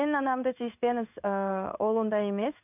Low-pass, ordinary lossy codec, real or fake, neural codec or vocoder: 3.6 kHz; none; fake; codec, 16 kHz in and 24 kHz out, 1 kbps, XY-Tokenizer